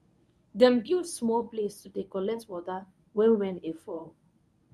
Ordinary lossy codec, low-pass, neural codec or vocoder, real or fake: none; none; codec, 24 kHz, 0.9 kbps, WavTokenizer, medium speech release version 1; fake